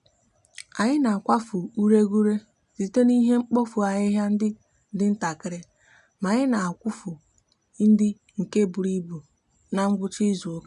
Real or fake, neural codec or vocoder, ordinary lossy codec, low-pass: real; none; MP3, 96 kbps; 10.8 kHz